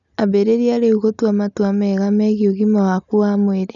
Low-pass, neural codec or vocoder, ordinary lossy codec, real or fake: 7.2 kHz; none; none; real